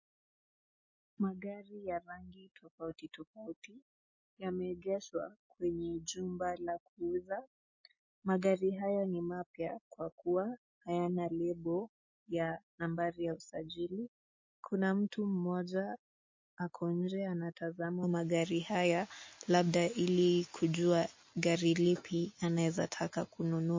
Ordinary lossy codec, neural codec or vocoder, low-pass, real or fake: MP3, 32 kbps; none; 7.2 kHz; real